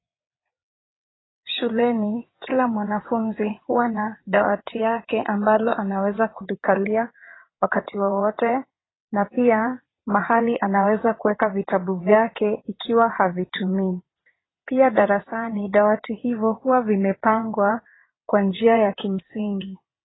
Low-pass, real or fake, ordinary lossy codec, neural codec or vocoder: 7.2 kHz; fake; AAC, 16 kbps; vocoder, 22.05 kHz, 80 mel bands, WaveNeXt